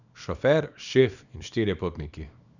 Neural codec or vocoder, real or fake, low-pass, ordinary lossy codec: codec, 24 kHz, 0.9 kbps, WavTokenizer, small release; fake; 7.2 kHz; none